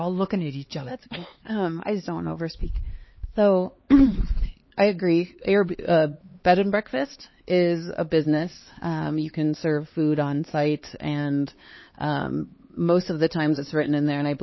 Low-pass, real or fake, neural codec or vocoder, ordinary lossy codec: 7.2 kHz; fake; codec, 16 kHz, 4 kbps, X-Codec, HuBERT features, trained on LibriSpeech; MP3, 24 kbps